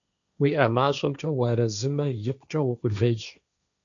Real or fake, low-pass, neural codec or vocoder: fake; 7.2 kHz; codec, 16 kHz, 1.1 kbps, Voila-Tokenizer